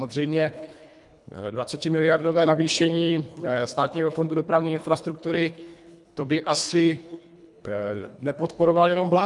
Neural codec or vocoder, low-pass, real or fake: codec, 24 kHz, 1.5 kbps, HILCodec; 10.8 kHz; fake